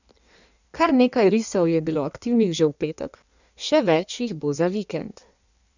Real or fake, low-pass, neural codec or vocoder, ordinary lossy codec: fake; 7.2 kHz; codec, 16 kHz in and 24 kHz out, 1.1 kbps, FireRedTTS-2 codec; none